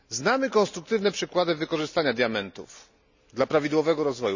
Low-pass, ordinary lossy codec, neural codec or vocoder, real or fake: 7.2 kHz; none; none; real